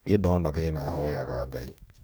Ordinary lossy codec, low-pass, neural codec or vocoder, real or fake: none; none; codec, 44.1 kHz, 2.6 kbps, DAC; fake